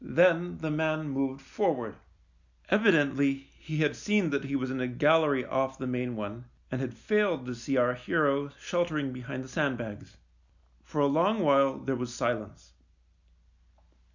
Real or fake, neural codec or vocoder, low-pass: real; none; 7.2 kHz